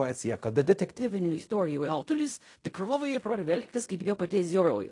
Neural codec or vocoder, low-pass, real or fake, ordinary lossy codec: codec, 16 kHz in and 24 kHz out, 0.4 kbps, LongCat-Audio-Codec, fine tuned four codebook decoder; 10.8 kHz; fake; AAC, 48 kbps